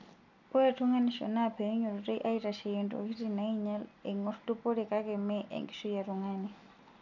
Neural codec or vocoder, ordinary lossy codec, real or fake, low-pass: none; none; real; 7.2 kHz